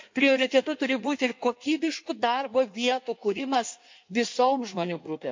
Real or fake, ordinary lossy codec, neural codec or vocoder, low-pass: fake; MP3, 48 kbps; codec, 16 kHz in and 24 kHz out, 1.1 kbps, FireRedTTS-2 codec; 7.2 kHz